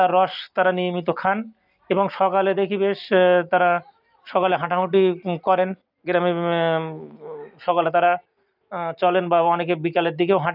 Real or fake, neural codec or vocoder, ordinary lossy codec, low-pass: real; none; none; 5.4 kHz